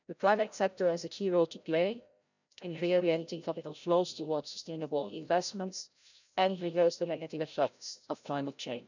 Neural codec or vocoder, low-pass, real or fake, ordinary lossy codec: codec, 16 kHz, 0.5 kbps, FreqCodec, larger model; 7.2 kHz; fake; none